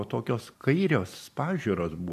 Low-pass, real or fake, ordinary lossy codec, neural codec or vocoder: 14.4 kHz; fake; MP3, 96 kbps; vocoder, 44.1 kHz, 128 mel bands every 512 samples, BigVGAN v2